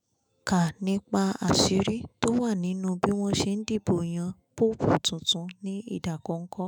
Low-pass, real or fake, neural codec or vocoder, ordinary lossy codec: none; fake; autoencoder, 48 kHz, 128 numbers a frame, DAC-VAE, trained on Japanese speech; none